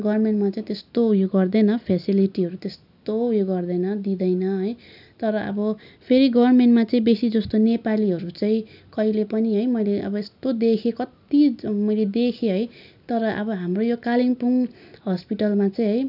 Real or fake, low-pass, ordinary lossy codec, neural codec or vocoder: real; 5.4 kHz; none; none